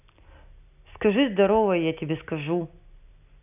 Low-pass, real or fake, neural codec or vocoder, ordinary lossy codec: 3.6 kHz; real; none; none